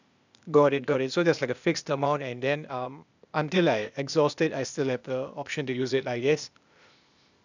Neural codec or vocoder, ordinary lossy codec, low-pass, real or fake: codec, 16 kHz, 0.8 kbps, ZipCodec; none; 7.2 kHz; fake